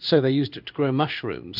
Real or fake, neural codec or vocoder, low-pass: fake; codec, 16 kHz in and 24 kHz out, 1 kbps, XY-Tokenizer; 5.4 kHz